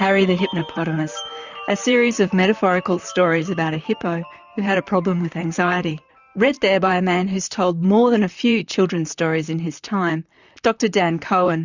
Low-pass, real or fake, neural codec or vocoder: 7.2 kHz; fake; vocoder, 44.1 kHz, 128 mel bands, Pupu-Vocoder